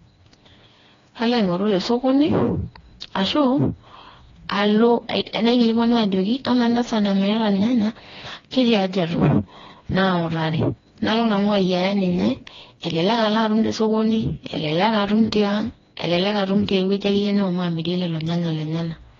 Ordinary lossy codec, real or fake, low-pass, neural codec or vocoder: AAC, 32 kbps; fake; 7.2 kHz; codec, 16 kHz, 2 kbps, FreqCodec, smaller model